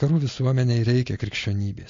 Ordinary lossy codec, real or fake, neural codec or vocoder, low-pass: AAC, 48 kbps; real; none; 7.2 kHz